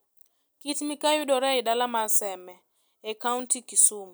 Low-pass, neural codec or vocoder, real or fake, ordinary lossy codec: none; none; real; none